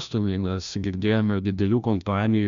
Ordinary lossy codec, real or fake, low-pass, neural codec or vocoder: Opus, 64 kbps; fake; 7.2 kHz; codec, 16 kHz, 1 kbps, FreqCodec, larger model